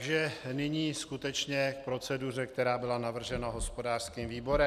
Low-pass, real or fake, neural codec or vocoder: 14.4 kHz; real; none